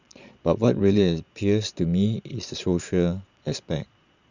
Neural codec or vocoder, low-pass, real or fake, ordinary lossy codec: vocoder, 22.05 kHz, 80 mel bands, Vocos; 7.2 kHz; fake; none